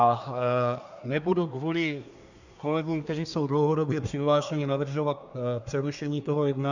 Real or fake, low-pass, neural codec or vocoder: fake; 7.2 kHz; codec, 24 kHz, 1 kbps, SNAC